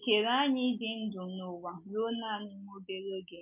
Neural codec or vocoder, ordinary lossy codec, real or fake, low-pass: none; MP3, 32 kbps; real; 3.6 kHz